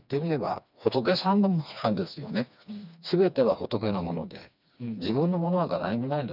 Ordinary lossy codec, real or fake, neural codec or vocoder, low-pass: none; fake; codec, 16 kHz, 2 kbps, FreqCodec, smaller model; 5.4 kHz